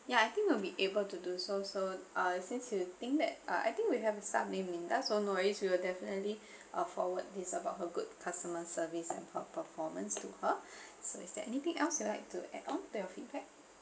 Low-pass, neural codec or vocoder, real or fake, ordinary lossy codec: none; none; real; none